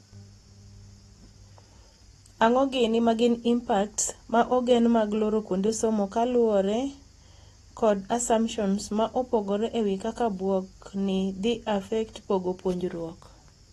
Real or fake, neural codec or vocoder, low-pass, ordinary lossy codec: real; none; 19.8 kHz; AAC, 32 kbps